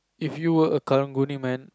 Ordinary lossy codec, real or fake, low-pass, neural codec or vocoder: none; real; none; none